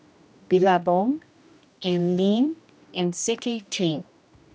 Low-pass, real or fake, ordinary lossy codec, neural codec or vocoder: none; fake; none; codec, 16 kHz, 1 kbps, X-Codec, HuBERT features, trained on general audio